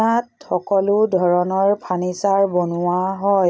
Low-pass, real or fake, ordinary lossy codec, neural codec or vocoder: none; real; none; none